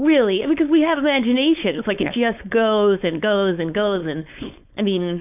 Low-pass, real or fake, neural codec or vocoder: 3.6 kHz; fake; codec, 16 kHz, 4.8 kbps, FACodec